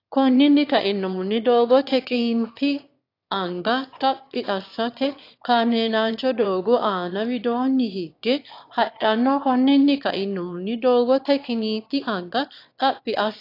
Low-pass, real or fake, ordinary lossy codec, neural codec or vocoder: 5.4 kHz; fake; AAC, 32 kbps; autoencoder, 22.05 kHz, a latent of 192 numbers a frame, VITS, trained on one speaker